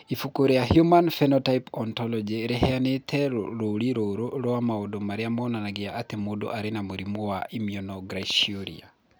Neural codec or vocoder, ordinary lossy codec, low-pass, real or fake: none; none; none; real